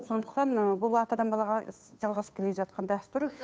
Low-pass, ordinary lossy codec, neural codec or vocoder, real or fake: none; none; codec, 16 kHz, 2 kbps, FunCodec, trained on Chinese and English, 25 frames a second; fake